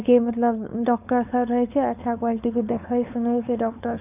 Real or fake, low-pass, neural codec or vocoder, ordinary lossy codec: fake; 3.6 kHz; codec, 16 kHz, 4.8 kbps, FACodec; none